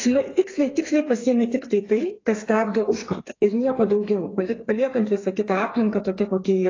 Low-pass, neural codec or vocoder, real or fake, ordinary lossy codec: 7.2 kHz; codec, 32 kHz, 1.9 kbps, SNAC; fake; AAC, 32 kbps